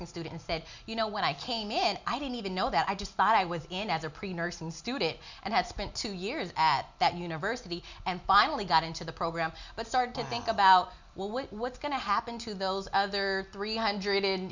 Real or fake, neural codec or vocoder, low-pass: real; none; 7.2 kHz